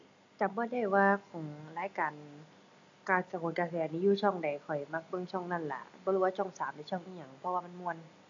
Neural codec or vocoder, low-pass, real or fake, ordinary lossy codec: none; 7.2 kHz; real; AAC, 64 kbps